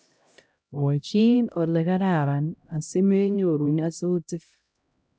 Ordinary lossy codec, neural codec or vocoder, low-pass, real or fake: none; codec, 16 kHz, 0.5 kbps, X-Codec, HuBERT features, trained on LibriSpeech; none; fake